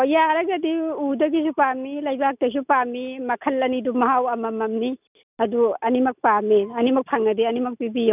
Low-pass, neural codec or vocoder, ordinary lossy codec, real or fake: 3.6 kHz; none; none; real